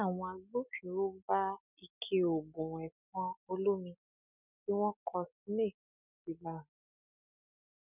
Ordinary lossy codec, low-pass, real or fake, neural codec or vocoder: none; 3.6 kHz; real; none